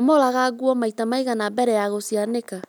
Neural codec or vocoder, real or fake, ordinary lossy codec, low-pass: none; real; none; none